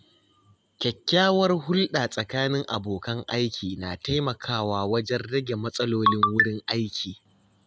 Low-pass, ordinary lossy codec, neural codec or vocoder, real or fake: none; none; none; real